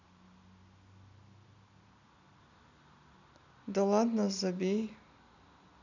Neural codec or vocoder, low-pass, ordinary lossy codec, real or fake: none; 7.2 kHz; none; real